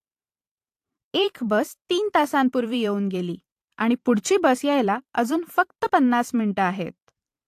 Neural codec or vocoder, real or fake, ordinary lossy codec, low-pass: vocoder, 44.1 kHz, 128 mel bands every 512 samples, BigVGAN v2; fake; AAC, 64 kbps; 14.4 kHz